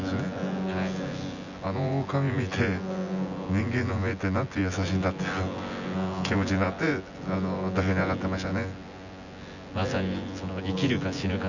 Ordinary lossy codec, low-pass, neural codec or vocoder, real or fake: none; 7.2 kHz; vocoder, 24 kHz, 100 mel bands, Vocos; fake